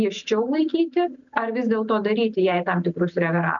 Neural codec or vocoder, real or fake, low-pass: none; real; 7.2 kHz